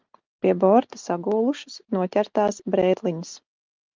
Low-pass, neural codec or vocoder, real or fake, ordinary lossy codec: 7.2 kHz; none; real; Opus, 24 kbps